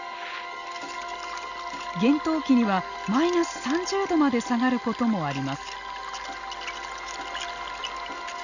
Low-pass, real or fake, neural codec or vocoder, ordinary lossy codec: 7.2 kHz; real; none; none